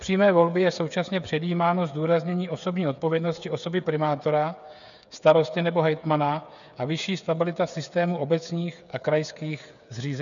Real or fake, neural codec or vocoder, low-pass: fake; codec, 16 kHz, 8 kbps, FreqCodec, smaller model; 7.2 kHz